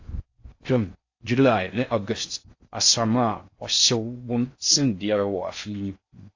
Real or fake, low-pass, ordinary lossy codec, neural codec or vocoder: fake; 7.2 kHz; AAC, 48 kbps; codec, 16 kHz in and 24 kHz out, 0.6 kbps, FocalCodec, streaming, 4096 codes